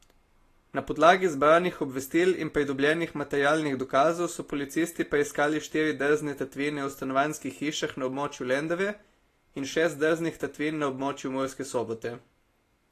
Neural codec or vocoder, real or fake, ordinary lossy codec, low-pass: none; real; AAC, 48 kbps; 14.4 kHz